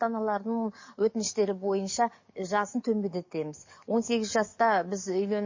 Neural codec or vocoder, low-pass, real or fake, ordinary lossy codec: codec, 44.1 kHz, 7.8 kbps, DAC; 7.2 kHz; fake; MP3, 32 kbps